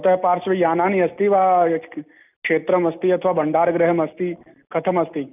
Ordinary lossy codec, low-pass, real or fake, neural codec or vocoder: none; 3.6 kHz; real; none